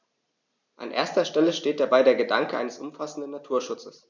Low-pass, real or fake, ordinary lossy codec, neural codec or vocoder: 7.2 kHz; real; none; none